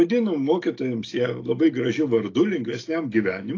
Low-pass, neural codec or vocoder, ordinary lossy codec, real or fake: 7.2 kHz; none; AAC, 32 kbps; real